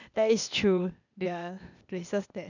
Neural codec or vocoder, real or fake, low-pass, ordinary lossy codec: codec, 16 kHz, 0.8 kbps, ZipCodec; fake; 7.2 kHz; none